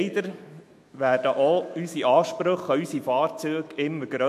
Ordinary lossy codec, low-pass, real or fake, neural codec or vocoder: MP3, 64 kbps; 14.4 kHz; fake; autoencoder, 48 kHz, 128 numbers a frame, DAC-VAE, trained on Japanese speech